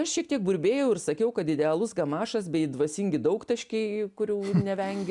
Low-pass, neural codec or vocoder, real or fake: 10.8 kHz; none; real